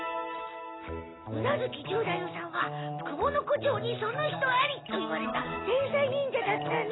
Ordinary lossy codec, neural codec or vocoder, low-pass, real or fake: AAC, 16 kbps; none; 7.2 kHz; real